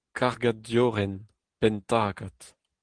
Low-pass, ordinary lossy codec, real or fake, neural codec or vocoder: 9.9 kHz; Opus, 16 kbps; real; none